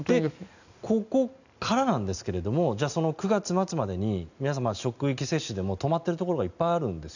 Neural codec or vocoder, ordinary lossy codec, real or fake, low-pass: none; none; real; 7.2 kHz